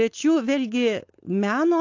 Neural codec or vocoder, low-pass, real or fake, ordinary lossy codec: codec, 16 kHz, 4.8 kbps, FACodec; 7.2 kHz; fake; AAC, 48 kbps